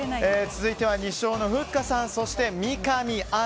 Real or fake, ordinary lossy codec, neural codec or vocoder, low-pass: real; none; none; none